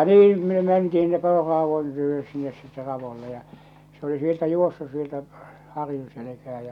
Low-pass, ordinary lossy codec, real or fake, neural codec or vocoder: 19.8 kHz; none; real; none